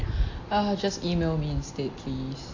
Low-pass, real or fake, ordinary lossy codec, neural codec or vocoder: 7.2 kHz; real; AAC, 48 kbps; none